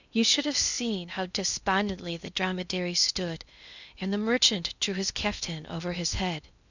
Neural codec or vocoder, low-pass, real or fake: codec, 16 kHz in and 24 kHz out, 0.6 kbps, FocalCodec, streaming, 2048 codes; 7.2 kHz; fake